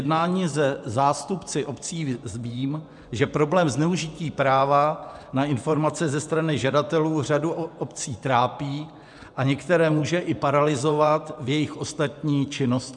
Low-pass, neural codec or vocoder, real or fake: 10.8 kHz; vocoder, 24 kHz, 100 mel bands, Vocos; fake